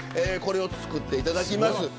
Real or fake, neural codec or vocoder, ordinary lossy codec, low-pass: real; none; none; none